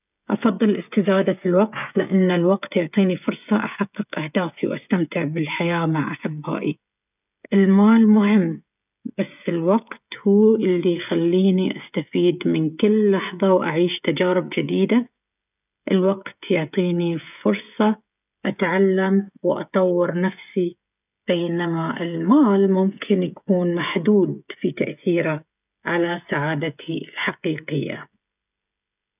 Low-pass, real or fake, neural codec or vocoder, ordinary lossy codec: 3.6 kHz; fake; codec, 16 kHz, 16 kbps, FreqCodec, smaller model; none